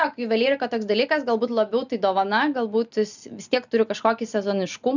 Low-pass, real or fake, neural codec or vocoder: 7.2 kHz; real; none